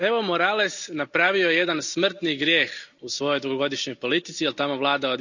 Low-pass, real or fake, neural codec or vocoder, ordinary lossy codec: 7.2 kHz; real; none; none